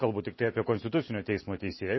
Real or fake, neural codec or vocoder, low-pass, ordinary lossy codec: real; none; 7.2 kHz; MP3, 24 kbps